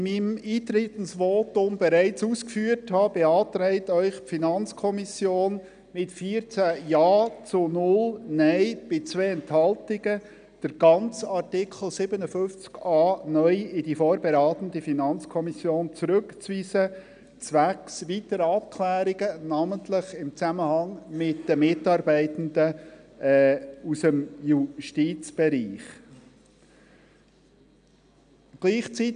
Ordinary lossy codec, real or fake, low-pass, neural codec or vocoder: none; real; 9.9 kHz; none